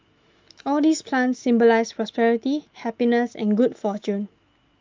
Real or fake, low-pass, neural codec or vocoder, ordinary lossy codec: fake; 7.2 kHz; autoencoder, 48 kHz, 128 numbers a frame, DAC-VAE, trained on Japanese speech; Opus, 32 kbps